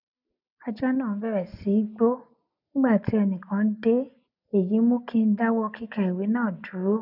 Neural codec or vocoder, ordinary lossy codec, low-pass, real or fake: none; none; 5.4 kHz; real